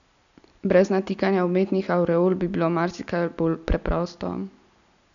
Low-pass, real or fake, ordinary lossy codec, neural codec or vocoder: 7.2 kHz; real; none; none